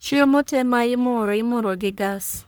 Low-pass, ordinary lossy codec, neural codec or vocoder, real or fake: none; none; codec, 44.1 kHz, 1.7 kbps, Pupu-Codec; fake